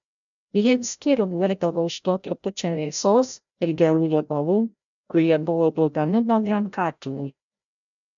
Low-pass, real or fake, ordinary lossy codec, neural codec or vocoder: 7.2 kHz; fake; MP3, 96 kbps; codec, 16 kHz, 0.5 kbps, FreqCodec, larger model